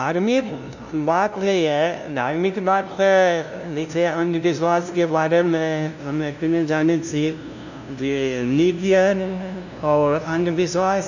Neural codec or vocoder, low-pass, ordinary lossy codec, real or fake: codec, 16 kHz, 0.5 kbps, FunCodec, trained on LibriTTS, 25 frames a second; 7.2 kHz; none; fake